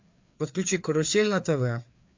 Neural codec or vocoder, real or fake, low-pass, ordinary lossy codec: codec, 16 kHz, 4 kbps, FreqCodec, larger model; fake; 7.2 kHz; MP3, 64 kbps